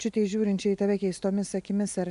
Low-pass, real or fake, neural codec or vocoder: 10.8 kHz; real; none